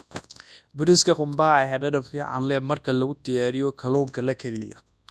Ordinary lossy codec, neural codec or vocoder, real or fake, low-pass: none; codec, 24 kHz, 0.9 kbps, WavTokenizer, large speech release; fake; none